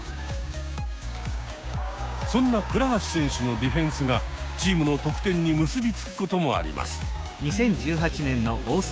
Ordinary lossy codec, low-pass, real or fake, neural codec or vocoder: none; none; fake; codec, 16 kHz, 6 kbps, DAC